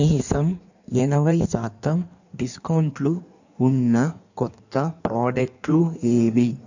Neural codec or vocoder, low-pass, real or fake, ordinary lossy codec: codec, 16 kHz in and 24 kHz out, 1.1 kbps, FireRedTTS-2 codec; 7.2 kHz; fake; none